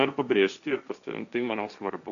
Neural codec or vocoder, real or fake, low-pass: codec, 16 kHz, 1.1 kbps, Voila-Tokenizer; fake; 7.2 kHz